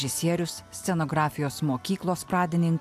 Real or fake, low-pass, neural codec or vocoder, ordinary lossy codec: real; 14.4 kHz; none; AAC, 96 kbps